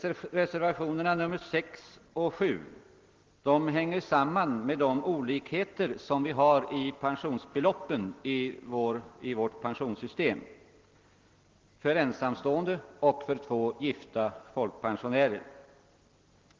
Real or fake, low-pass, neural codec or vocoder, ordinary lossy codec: real; 7.2 kHz; none; Opus, 16 kbps